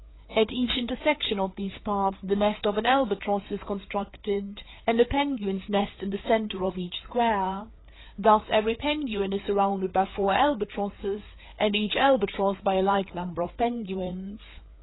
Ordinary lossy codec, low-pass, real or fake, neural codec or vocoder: AAC, 16 kbps; 7.2 kHz; fake; codec, 16 kHz, 4 kbps, FreqCodec, larger model